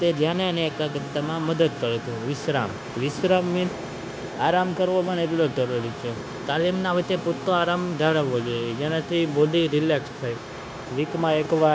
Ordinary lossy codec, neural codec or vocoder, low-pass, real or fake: none; codec, 16 kHz, 0.9 kbps, LongCat-Audio-Codec; none; fake